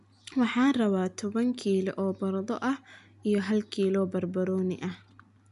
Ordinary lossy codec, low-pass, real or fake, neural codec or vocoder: none; 10.8 kHz; real; none